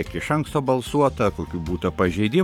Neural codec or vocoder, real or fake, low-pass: codec, 44.1 kHz, 7.8 kbps, Pupu-Codec; fake; 19.8 kHz